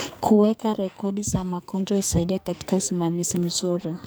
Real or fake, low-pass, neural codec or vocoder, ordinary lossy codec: fake; none; codec, 44.1 kHz, 2.6 kbps, SNAC; none